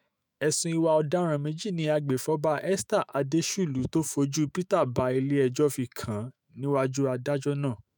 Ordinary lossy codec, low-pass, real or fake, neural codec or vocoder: none; none; fake; autoencoder, 48 kHz, 128 numbers a frame, DAC-VAE, trained on Japanese speech